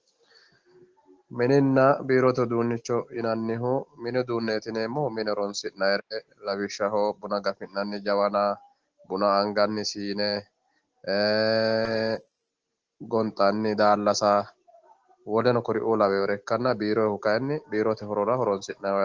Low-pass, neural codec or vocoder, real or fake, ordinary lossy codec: 7.2 kHz; none; real; Opus, 16 kbps